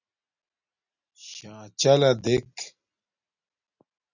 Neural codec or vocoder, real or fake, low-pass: none; real; 7.2 kHz